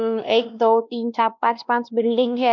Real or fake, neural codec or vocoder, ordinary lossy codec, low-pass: fake; codec, 16 kHz, 1 kbps, X-Codec, WavLM features, trained on Multilingual LibriSpeech; none; 7.2 kHz